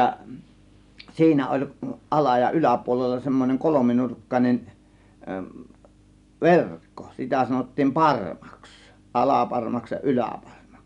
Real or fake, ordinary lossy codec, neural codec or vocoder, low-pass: real; none; none; 10.8 kHz